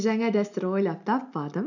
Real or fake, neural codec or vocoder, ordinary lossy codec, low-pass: real; none; none; 7.2 kHz